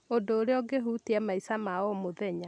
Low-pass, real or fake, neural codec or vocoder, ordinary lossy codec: 9.9 kHz; real; none; none